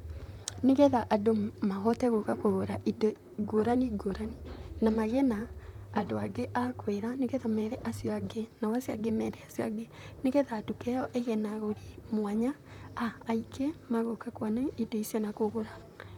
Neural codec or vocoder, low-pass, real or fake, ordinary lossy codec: vocoder, 44.1 kHz, 128 mel bands, Pupu-Vocoder; 19.8 kHz; fake; none